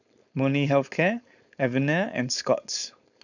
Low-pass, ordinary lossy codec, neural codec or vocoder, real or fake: 7.2 kHz; none; codec, 16 kHz, 4.8 kbps, FACodec; fake